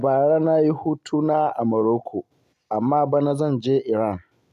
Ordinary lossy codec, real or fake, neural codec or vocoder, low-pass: none; real; none; 14.4 kHz